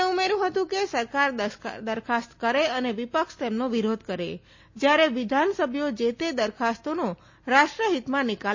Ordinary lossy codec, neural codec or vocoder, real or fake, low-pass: MP3, 32 kbps; none; real; 7.2 kHz